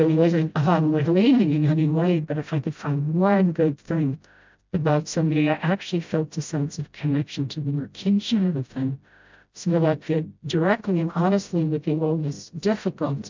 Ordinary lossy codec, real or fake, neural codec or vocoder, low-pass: MP3, 64 kbps; fake; codec, 16 kHz, 0.5 kbps, FreqCodec, smaller model; 7.2 kHz